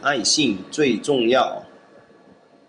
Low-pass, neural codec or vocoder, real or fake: 9.9 kHz; none; real